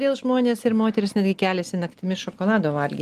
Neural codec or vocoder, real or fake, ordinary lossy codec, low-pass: none; real; Opus, 24 kbps; 14.4 kHz